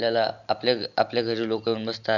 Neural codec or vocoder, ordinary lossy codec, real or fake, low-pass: none; none; real; 7.2 kHz